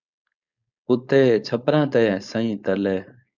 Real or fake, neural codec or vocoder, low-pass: fake; codec, 16 kHz, 4.8 kbps, FACodec; 7.2 kHz